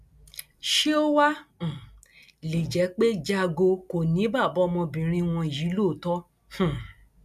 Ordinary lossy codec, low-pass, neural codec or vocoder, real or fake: none; 14.4 kHz; none; real